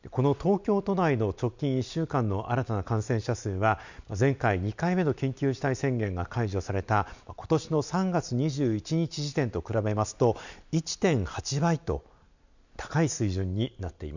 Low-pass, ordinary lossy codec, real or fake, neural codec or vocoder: 7.2 kHz; none; real; none